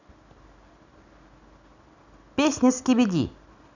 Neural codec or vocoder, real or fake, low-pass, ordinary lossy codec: none; real; 7.2 kHz; none